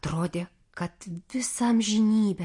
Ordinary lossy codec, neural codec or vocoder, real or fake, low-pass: MP3, 48 kbps; none; real; 10.8 kHz